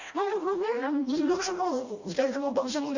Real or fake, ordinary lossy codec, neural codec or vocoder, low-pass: fake; Opus, 64 kbps; codec, 16 kHz, 1 kbps, FreqCodec, smaller model; 7.2 kHz